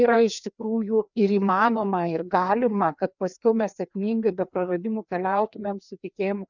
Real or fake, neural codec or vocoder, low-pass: fake; codec, 16 kHz, 2 kbps, FreqCodec, larger model; 7.2 kHz